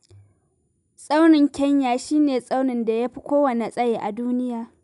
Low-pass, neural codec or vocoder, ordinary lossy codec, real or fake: 10.8 kHz; none; none; real